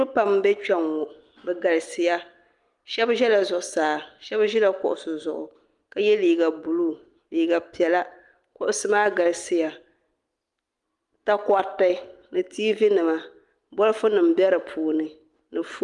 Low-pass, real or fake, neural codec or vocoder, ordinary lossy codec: 10.8 kHz; real; none; Opus, 32 kbps